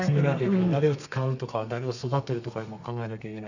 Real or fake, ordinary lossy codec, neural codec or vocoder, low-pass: fake; none; codec, 32 kHz, 1.9 kbps, SNAC; 7.2 kHz